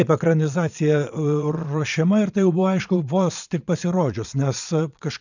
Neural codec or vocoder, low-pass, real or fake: vocoder, 22.05 kHz, 80 mel bands, Vocos; 7.2 kHz; fake